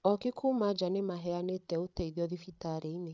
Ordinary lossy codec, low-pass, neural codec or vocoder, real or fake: none; none; codec, 16 kHz, 16 kbps, FreqCodec, larger model; fake